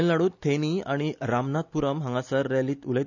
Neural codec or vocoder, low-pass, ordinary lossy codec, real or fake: none; 7.2 kHz; none; real